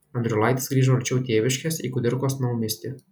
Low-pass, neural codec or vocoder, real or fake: 19.8 kHz; none; real